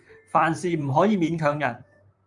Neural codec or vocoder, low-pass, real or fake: vocoder, 44.1 kHz, 128 mel bands, Pupu-Vocoder; 10.8 kHz; fake